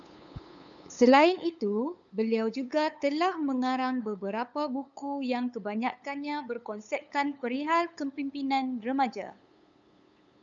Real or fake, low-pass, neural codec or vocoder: fake; 7.2 kHz; codec, 16 kHz, 8 kbps, FunCodec, trained on LibriTTS, 25 frames a second